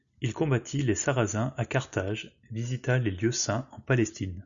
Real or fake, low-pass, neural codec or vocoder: real; 7.2 kHz; none